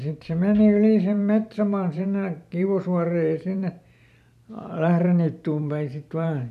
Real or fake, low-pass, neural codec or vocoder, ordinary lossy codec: fake; 14.4 kHz; vocoder, 44.1 kHz, 128 mel bands every 512 samples, BigVGAN v2; none